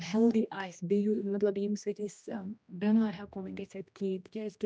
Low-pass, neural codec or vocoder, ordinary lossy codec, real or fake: none; codec, 16 kHz, 1 kbps, X-Codec, HuBERT features, trained on general audio; none; fake